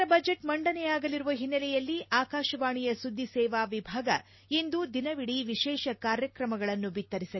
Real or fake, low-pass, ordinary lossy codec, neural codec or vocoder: real; 7.2 kHz; MP3, 24 kbps; none